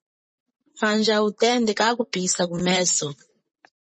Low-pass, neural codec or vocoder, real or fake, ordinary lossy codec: 10.8 kHz; vocoder, 44.1 kHz, 128 mel bands, Pupu-Vocoder; fake; MP3, 32 kbps